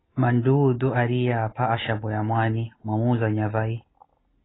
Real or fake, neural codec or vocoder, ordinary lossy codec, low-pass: real; none; AAC, 16 kbps; 7.2 kHz